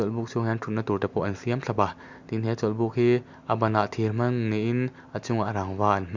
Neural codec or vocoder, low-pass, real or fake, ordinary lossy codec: none; 7.2 kHz; real; MP3, 64 kbps